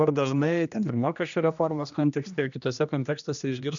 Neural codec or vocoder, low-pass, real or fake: codec, 16 kHz, 1 kbps, X-Codec, HuBERT features, trained on general audio; 7.2 kHz; fake